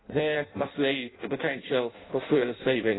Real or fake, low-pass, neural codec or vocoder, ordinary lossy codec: fake; 7.2 kHz; codec, 16 kHz in and 24 kHz out, 0.6 kbps, FireRedTTS-2 codec; AAC, 16 kbps